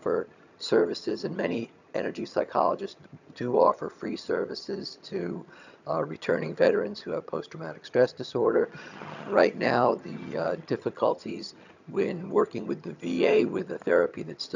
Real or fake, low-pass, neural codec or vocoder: fake; 7.2 kHz; vocoder, 22.05 kHz, 80 mel bands, HiFi-GAN